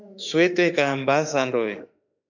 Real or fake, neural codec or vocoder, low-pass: fake; autoencoder, 48 kHz, 32 numbers a frame, DAC-VAE, trained on Japanese speech; 7.2 kHz